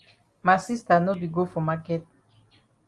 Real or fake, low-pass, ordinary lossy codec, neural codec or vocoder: real; 10.8 kHz; Opus, 32 kbps; none